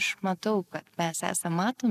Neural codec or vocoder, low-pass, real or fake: none; 14.4 kHz; real